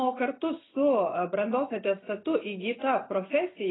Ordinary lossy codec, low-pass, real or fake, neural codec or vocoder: AAC, 16 kbps; 7.2 kHz; real; none